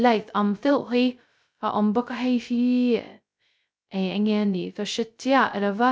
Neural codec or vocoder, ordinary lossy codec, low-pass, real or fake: codec, 16 kHz, 0.2 kbps, FocalCodec; none; none; fake